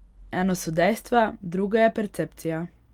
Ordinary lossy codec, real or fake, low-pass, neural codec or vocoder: Opus, 32 kbps; real; 19.8 kHz; none